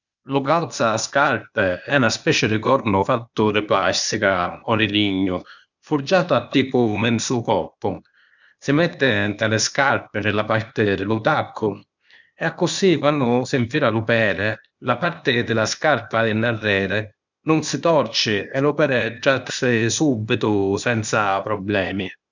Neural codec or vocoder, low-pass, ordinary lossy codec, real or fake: codec, 16 kHz, 0.8 kbps, ZipCodec; 7.2 kHz; none; fake